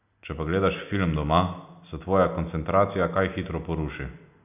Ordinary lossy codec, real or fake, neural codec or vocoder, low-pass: none; real; none; 3.6 kHz